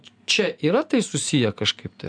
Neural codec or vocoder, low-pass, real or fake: none; 9.9 kHz; real